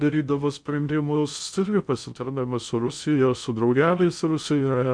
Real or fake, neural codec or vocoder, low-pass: fake; codec, 16 kHz in and 24 kHz out, 0.6 kbps, FocalCodec, streaming, 2048 codes; 9.9 kHz